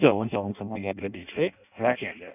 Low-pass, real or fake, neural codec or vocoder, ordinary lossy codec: 3.6 kHz; fake; codec, 16 kHz in and 24 kHz out, 0.6 kbps, FireRedTTS-2 codec; none